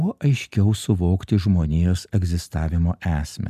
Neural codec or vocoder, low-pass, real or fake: none; 14.4 kHz; real